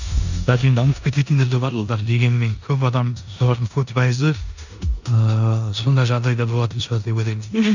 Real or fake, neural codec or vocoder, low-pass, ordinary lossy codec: fake; codec, 16 kHz in and 24 kHz out, 0.9 kbps, LongCat-Audio-Codec, four codebook decoder; 7.2 kHz; none